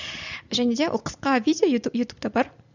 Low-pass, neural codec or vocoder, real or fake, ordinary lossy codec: 7.2 kHz; none; real; none